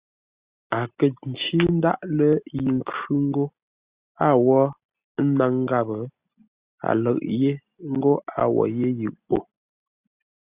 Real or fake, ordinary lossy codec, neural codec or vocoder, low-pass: real; Opus, 64 kbps; none; 3.6 kHz